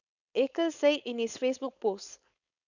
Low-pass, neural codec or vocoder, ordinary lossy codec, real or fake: 7.2 kHz; codec, 16 kHz, 4.8 kbps, FACodec; none; fake